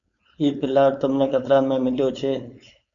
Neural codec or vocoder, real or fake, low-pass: codec, 16 kHz, 4.8 kbps, FACodec; fake; 7.2 kHz